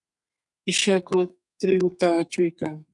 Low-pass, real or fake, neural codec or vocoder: 10.8 kHz; fake; codec, 32 kHz, 1.9 kbps, SNAC